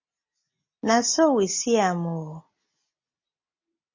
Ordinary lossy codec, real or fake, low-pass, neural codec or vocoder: MP3, 32 kbps; real; 7.2 kHz; none